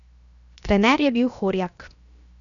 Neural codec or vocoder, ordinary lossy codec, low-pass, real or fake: codec, 16 kHz, 0.7 kbps, FocalCodec; none; 7.2 kHz; fake